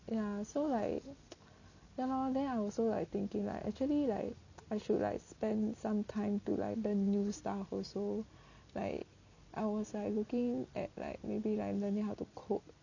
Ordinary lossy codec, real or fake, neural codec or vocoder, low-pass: none; real; none; 7.2 kHz